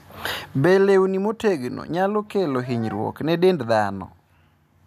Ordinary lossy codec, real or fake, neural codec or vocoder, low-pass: none; real; none; 14.4 kHz